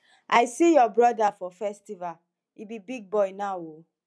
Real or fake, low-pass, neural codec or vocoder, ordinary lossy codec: real; none; none; none